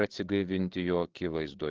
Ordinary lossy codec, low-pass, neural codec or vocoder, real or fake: Opus, 16 kbps; 7.2 kHz; codec, 16 kHz, 8 kbps, FreqCodec, larger model; fake